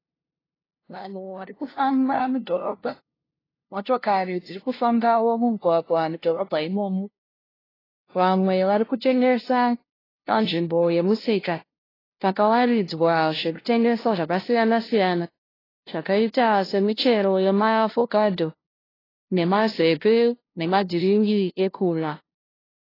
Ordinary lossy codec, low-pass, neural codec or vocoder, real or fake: AAC, 24 kbps; 5.4 kHz; codec, 16 kHz, 0.5 kbps, FunCodec, trained on LibriTTS, 25 frames a second; fake